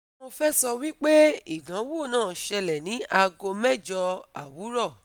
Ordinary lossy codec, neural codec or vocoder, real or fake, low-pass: none; none; real; none